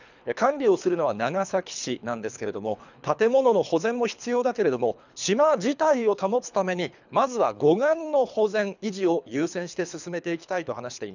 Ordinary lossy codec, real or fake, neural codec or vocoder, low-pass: none; fake; codec, 24 kHz, 3 kbps, HILCodec; 7.2 kHz